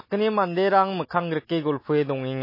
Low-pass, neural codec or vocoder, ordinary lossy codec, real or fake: 5.4 kHz; none; MP3, 24 kbps; real